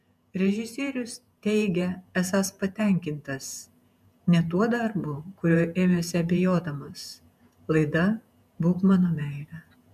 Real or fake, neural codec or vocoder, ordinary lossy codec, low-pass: fake; vocoder, 48 kHz, 128 mel bands, Vocos; MP3, 96 kbps; 14.4 kHz